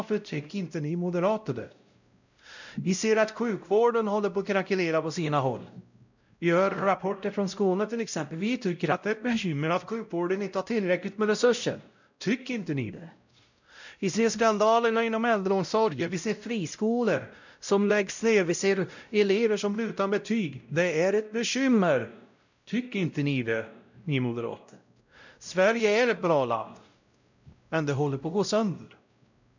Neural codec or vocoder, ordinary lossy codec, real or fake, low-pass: codec, 16 kHz, 0.5 kbps, X-Codec, WavLM features, trained on Multilingual LibriSpeech; none; fake; 7.2 kHz